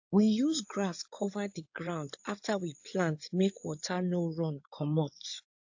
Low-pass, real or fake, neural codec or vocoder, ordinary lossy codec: 7.2 kHz; fake; codec, 16 kHz in and 24 kHz out, 2.2 kbps, FireRedTTS-2 codec; AAC, 48 kbps